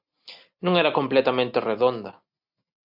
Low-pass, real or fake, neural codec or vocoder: 5.4 kHz; real; none